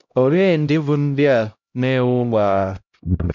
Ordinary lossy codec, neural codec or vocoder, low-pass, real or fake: none; codec, 16 kHz, 0.5 kbps, X-Codec, HuBERT features, trained on LibriSpeech; 7.2 kHz; fake